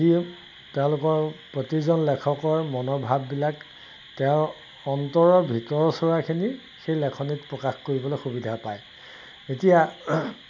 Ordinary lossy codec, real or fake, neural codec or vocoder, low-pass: none; real; none; 7.2 kHz